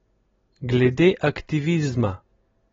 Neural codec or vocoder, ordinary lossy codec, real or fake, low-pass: none; AAC, 24 kbps; real; 7.2 kHz